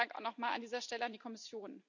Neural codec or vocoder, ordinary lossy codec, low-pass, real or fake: none; none; 7.2 kHz; real